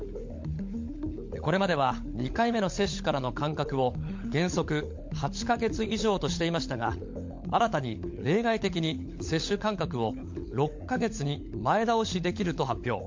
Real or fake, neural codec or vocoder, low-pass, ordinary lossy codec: fake; codec, 16 kHz, 16 kbps, FunCodec, trained on LibriTTS, 50 frames a second; 7.2 kHz; MP3, 48 kbps